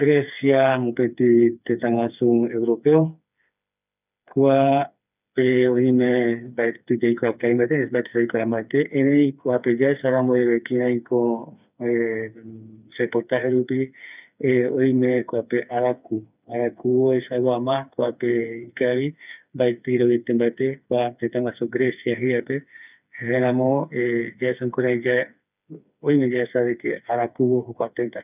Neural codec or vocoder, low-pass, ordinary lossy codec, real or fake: codec, 16 kHz, 4 kbps, FreqCodec, smaller model; 3.6 kHz; none; fake